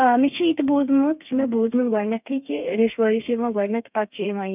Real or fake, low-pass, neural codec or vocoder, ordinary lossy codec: fake; 3.6 kHz; codec, 32 kHz, 1.9 kbps, SNAC; none